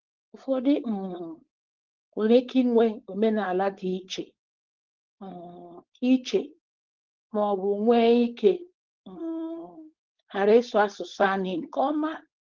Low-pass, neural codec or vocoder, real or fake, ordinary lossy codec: 7.2 kHz; codec, 16 kHz, 4.8 kbps, FACodec; fake; Opus, 16 kbps